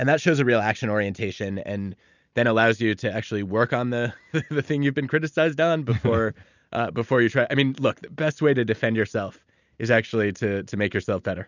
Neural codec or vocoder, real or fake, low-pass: none; real; 7.2 kHz